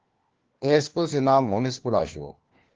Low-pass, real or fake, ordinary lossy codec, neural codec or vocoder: 7.2 kHz; fake; Opus, 16 kbps; codec, 16 kHz, 1 kbps, FunCodec, trained on LibriTTS, 50 frames a second